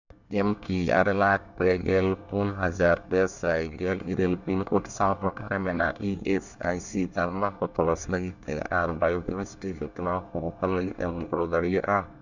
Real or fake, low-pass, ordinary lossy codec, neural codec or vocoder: fake; 7.2 kHz; none; codec, 24 kHz, 1 kbps, SNAC